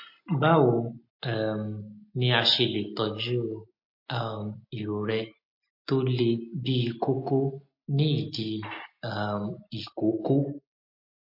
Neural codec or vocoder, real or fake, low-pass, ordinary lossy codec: none; real; 5.4 kHz; MP3, 32 kbps